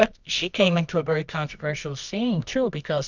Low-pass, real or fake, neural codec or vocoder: 7.2 kHz; fake; codec, 24 kHz, 0.9 kbps, WavTokenizer, medium music audio release